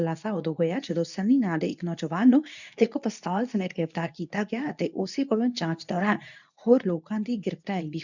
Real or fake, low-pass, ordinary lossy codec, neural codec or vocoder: fake; 7.2 kHz; none; codec, 24 kHz, 0.9 kbps, WavTokenizer, medium speech release version 2